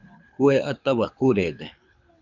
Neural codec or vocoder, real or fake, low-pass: codec, 24 kHz, 6 kbps, HILCodec; fake; 7.2 kHz